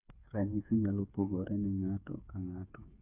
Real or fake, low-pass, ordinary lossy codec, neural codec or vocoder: fake; 3.6 kHz; Opus, 64 kbps; codec, 16 kHz, 8 kbps, FreqCodec, smaller model